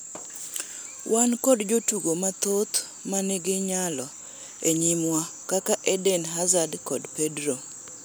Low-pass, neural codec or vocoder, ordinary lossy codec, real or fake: none; none; none; real